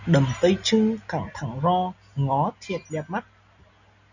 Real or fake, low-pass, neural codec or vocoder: real; 7.2 kHz; none